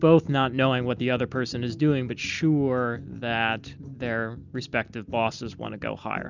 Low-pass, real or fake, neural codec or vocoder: 7.2 kHz; real; none